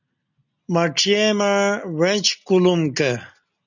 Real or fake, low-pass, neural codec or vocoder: real; 7.2 kHz; none